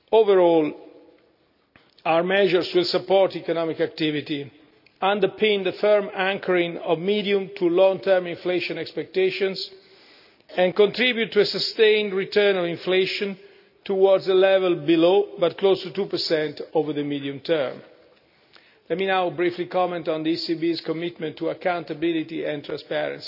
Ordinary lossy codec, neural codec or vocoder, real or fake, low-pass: none; none; real; 5.4 kHz